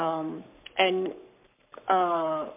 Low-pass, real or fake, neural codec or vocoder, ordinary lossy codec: 3.6 kHz; fake; codec, 44.1 kHz, 7.8 kbps, Pupu-Codec; MP3, 32 kbps